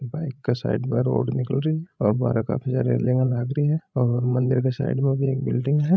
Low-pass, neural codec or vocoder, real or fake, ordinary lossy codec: none; codec, 16 kHz, 16 kbps, FreqCodec, larger model; fake; none